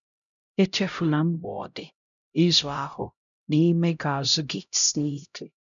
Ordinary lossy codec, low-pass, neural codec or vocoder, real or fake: none; 7.2 kHz; codec, 16 kHz, 0.5 kbps, X-Codec, HuBERT features, trained on LibriSpeech; fake